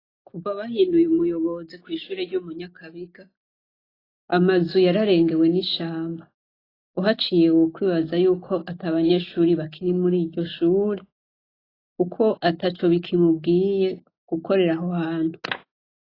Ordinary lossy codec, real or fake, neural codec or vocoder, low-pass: AAC, 24 kbps; real; none; 5.4 kHz